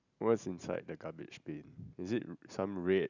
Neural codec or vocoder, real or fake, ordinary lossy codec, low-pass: none; real; none; 7.2 kHz